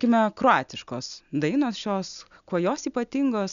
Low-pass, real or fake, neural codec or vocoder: 7.2 kHz; real; none